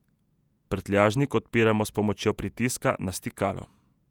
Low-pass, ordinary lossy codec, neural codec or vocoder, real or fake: 19.8 kHz; none; vocoder, 48 kHz, 128 mel bands, Vocos; fake